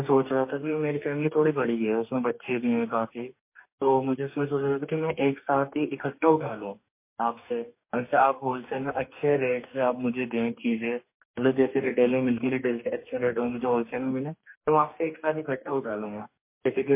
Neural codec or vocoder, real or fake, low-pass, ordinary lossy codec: codec, 44.1 kHz, 2.6 kbps, DAC; fake; 3.6 kHz; MP3, 24 kbps